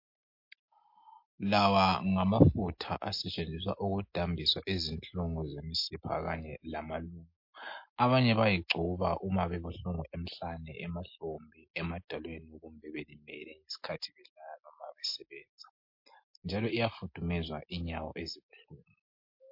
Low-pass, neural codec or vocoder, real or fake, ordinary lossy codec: 5.4 kHz; none; real; MP3, 32 kbps